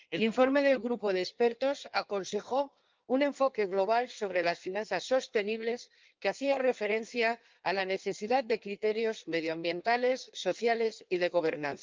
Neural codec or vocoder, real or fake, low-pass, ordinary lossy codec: codec, 16 kHz in and 24 kHz out, 1.1 kbps, FireRedTTS-2 codec; fake; 7.2 kHz; Opus, 32 kbps